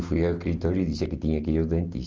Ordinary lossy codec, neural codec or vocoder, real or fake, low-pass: Opus, 24 kbps; none; real; 7.2 kHz